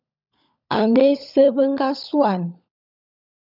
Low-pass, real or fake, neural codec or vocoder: 5.4 kHz; fake; codec, 16 kHz, 16 kbps, FunCodec, trained on LibriTTS, 50 frames a second